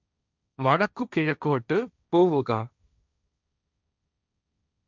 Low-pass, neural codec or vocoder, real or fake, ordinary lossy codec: 7.2 kHz; codec, 16 kHz, 1.1 kbps, Voila-Tokenizer; fake; none